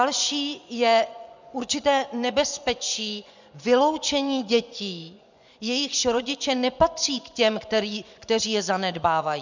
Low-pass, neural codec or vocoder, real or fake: 7.2 kHz; vocoder, 24 kHz, 100 mel bands, Vocos; fake